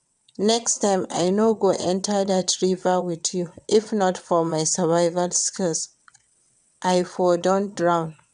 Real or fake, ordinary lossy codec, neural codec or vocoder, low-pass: fake; none; vocoder, 22.05 kHz, 80 mel bands, WaveNeXt; 9.9 kHz